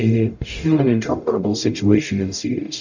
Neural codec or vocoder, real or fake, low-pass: codec, 44.1 kHz, 0.9 kbps, DAC; fake; 7.2 kHz